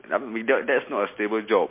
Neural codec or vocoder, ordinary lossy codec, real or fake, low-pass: none; MP3, 24 kbps; real; 3.6 kHz